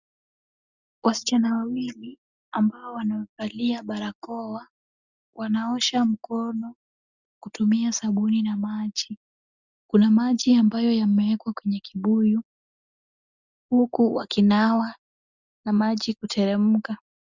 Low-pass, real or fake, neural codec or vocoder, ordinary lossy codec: 7.2 kHz; real; none; Opus, 64 kbps